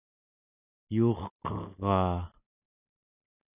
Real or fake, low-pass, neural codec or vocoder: real; 3.6 kHz; none